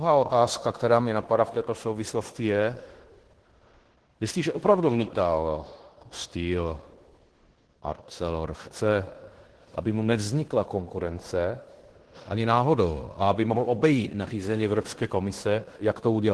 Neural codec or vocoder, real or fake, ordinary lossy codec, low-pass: codec, 16 kHz in and 24 kHz out, 0.9 kbps, LongCat-Audio-Codec, fine tuned four codebook decoder; fake; Opus, 16 kbps; 10.8 kHz